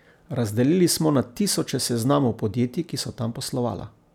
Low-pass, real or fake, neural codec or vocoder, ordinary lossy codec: 19.8 kHz; real; none; none